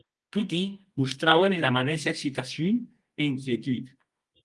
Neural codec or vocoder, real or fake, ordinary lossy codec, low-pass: codec, 24 kHz, 0.9 kbps, WavTokenizer, medium music audio release; fake; Opus, 24 kbps; 10.8 kHz